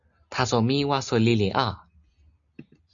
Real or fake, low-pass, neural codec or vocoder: real; 7.2 kHz; none